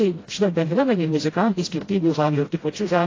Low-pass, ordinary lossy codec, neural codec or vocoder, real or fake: 7.2 kHz; AAC, 32 kbps; codec, 16 kHz, 0.5 kbps, FreqCodec, smaller model; fake